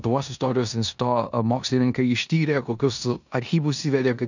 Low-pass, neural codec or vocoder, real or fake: 7.2 kHz; codec, 16 kHz in and 24 kHz out, 0.9 kbps, LongCat-Audio-Codec, four codebook decoder; fake